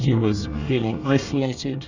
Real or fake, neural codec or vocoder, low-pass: fake; codec, 24 kHz, 1 kbps, SNAC; 7.2 kHz